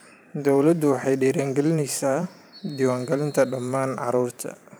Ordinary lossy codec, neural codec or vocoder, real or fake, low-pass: none; vocoder, 44.1 kHz, 128 mel bands every 512 samples, BigVGAN v2; fake; none